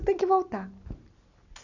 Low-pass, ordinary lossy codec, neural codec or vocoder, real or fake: 7.2 kHz; none; none; real